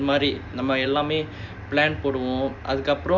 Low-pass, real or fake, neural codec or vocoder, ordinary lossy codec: 7.2 kHz; real; none; none